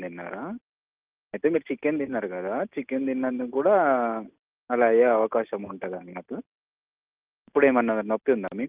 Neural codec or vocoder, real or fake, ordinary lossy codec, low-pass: none; real; Opus, 24 kbps; 3.6 kHz